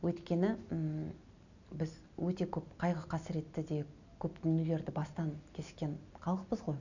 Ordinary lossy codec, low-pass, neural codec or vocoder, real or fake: none; 7.2 kHz; none; real